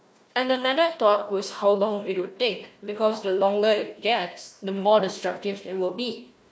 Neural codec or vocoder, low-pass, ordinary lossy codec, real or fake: codec, 16 kHz, 1 kbps, FunCodec, trained on Chinese and English, 50 frames a second; none; none; fake